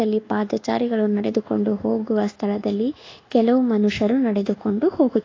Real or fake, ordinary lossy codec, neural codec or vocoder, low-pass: fake; AAC, 32 kbps; codec, 24 kHz, 1.2 kbps, DualCodec; 7.2 kHz